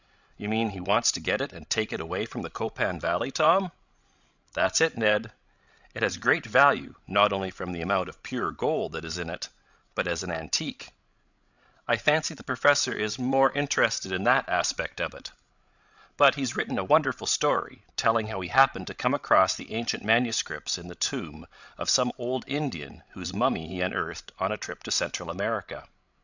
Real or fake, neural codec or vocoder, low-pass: fake; codec, 16 kHz, 16 kbps, FreqCodec, larger model; 7.2 kHz